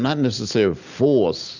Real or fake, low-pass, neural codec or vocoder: real; 7.2 kHz; none